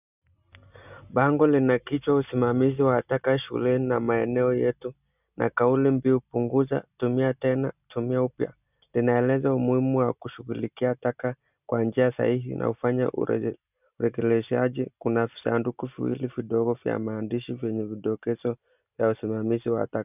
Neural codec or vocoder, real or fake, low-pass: none; real; 3.6 kHz